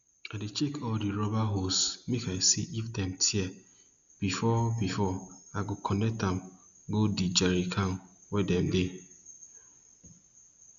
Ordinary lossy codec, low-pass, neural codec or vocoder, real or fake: none; 7.2 kHz; none; real